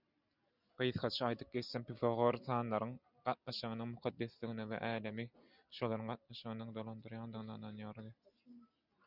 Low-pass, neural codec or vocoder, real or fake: 5.4 kHz; none; real